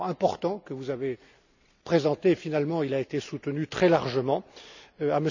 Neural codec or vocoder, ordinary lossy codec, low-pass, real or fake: none; none; 7.2 kHz; real